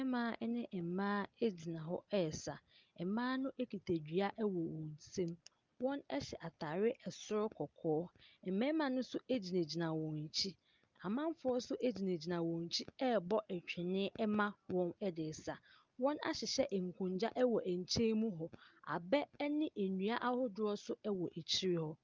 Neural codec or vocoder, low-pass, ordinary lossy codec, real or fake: none; 7.2 kHz; Opus, 24 kbps; real